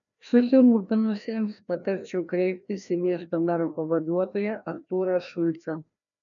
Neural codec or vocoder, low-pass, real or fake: codec, 16 kHz, 1 kbps, FreqCodec, larger model; 7.2 kHz; fake